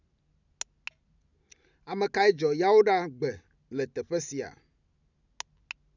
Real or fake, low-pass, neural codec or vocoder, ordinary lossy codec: real; 7.2 kHz; none; none